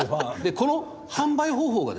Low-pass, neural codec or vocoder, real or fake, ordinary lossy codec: none; none; real; none